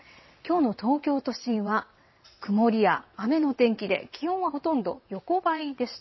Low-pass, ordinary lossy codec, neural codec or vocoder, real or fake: 7.2 kHz; MP3, 24 kbps; vocoder, 22.05 kHz, 80 mel bands, WaveNeXt; fake